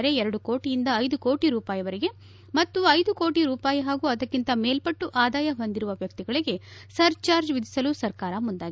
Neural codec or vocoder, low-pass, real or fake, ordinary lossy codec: none; none; real; none